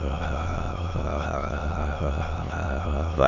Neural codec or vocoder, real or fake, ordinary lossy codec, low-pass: autoencoder, 22.05 kHz, a latent of 192 numbers a frame, VITS, trained on many speakers; fake; none; 7.2 kHz